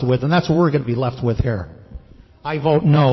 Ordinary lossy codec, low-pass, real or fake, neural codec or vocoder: MP3, 24 kbps; 7.2 kHz; fake; vocoder, 22.05 kHz, 80 mel bands, Vocos